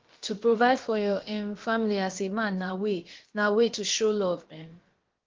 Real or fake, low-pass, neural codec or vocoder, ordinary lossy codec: fake; 7.2 kHz; codec, 16 kHz, about 1 kbps, DyCAST, with the encoder's durations; Opus, 16 kbps